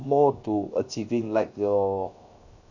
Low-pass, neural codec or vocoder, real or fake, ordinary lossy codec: 7.2 kHz; codec, 16 kHz, 0.7 kbps, FocalCodec; fake; none